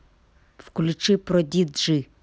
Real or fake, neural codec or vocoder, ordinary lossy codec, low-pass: real; none; none; none